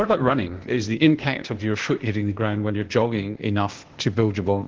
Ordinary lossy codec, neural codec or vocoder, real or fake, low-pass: Opus, 16 kbps; codec, 16 kHz, 0.8 kbps, ZipCodec; fake; 7.2 kHz